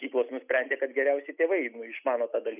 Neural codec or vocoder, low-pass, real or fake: none; 3.6 kHz; real